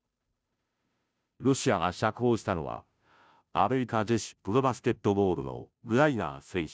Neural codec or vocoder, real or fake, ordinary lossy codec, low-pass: codec, 16 kHz, 0.5 kbps, FunCodec, trained on Chinese and English, 25 frames a second; fake; none; none